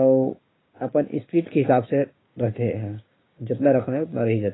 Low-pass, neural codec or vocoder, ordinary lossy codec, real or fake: 7.2 kHz; codec, 16 kHz, 6 kbps, DAC; AAC, 16 kbps; fake